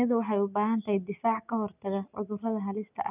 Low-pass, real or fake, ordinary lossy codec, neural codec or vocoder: 3.6 kHz; real; none; none